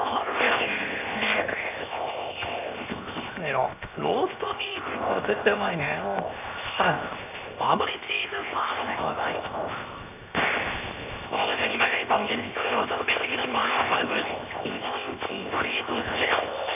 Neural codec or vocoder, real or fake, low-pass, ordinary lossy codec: codec, 16 kHz, 0.7 kbps, FocalCodec; fake; 3.6 kHz; none